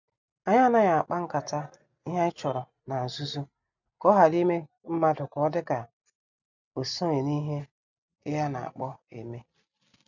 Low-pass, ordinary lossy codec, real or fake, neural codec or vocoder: 7.2 kHz; none; real; none